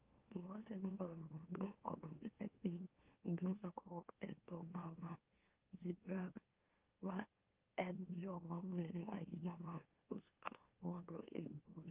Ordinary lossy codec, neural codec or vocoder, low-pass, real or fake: Opus, 32 kbps; autoencoder, 44.1 kHz, a latent of 192 numbers a frame, MeloTTS; 3.6 kHz; fake